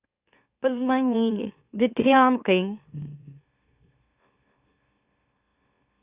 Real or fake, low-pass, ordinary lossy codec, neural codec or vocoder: fake; 3.6 kHz; Opus, 64 kbps; autoencoder, 44.1 kHz, a latent of 192 numbers a frame, MeloTTS